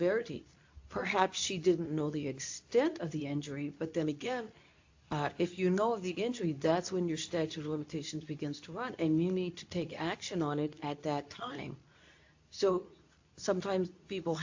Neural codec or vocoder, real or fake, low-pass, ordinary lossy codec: codec, 24 kHz, 0.9 kbps, WavTokenizer, medium speech release version 2; fake; 7.2 kHz; AAC, 48 kbps